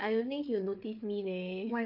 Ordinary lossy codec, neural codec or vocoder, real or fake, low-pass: AAC, 48 kbps; codec, 16 kHz, 8 kbps, FreqCodec, larger model; fake; 5.4 kHz